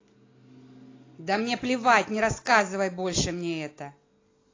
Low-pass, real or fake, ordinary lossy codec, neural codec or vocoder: 7.2 kHz; real; AAC, 32 kbps; none